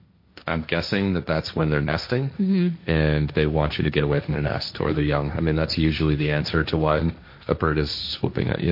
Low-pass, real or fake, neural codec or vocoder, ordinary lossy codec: 5.4 kHz; fake; codec, 16 kHz, 1.1 kbps, Voila-Tokenizer; MP3, 32 kbps